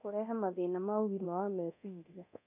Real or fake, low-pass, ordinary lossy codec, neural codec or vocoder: fake; 3.6 kHz; none; codec, 24 kHz, 0.9 kbps, DualCodec